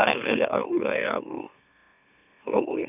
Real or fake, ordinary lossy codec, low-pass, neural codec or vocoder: fake; none; 3.6 kHz; autoencoder, 44.1 kHz, a latent of 192 numbers a frame, MeloTTS